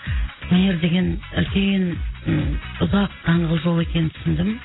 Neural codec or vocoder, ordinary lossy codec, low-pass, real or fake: none; AAC, 16 kbps; 7.2 kHz; real